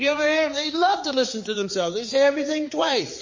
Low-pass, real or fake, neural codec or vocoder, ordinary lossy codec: 7.2 kHz; fake; codec, 16 kHz, 4 kbps, X-Codec, HuBERT features, trained on balanced general audio; MP3, 32 kbps